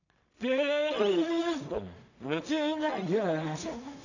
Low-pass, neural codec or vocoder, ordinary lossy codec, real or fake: 7.2 kHz; codec, 16 kHz in and 24 kHz out, 0.4 kbps, LongCat-Audio-Codec, two codebook decoder; none; fake